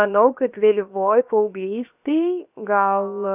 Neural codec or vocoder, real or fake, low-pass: codec, 16 kHz, 0.7 kbps, FocalCodec; fake; 3.6 kHz